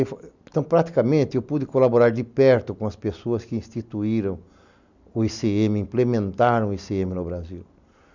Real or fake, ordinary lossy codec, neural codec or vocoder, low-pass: real; none; none; 7.2 kHz